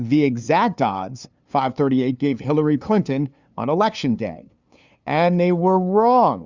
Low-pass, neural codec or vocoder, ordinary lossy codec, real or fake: 7.2 kHz; codec, 16 kHz, 2 kbps, FunCodec, trained on LibriTTS, 25 frames a second; Opus, 64 kbps; fake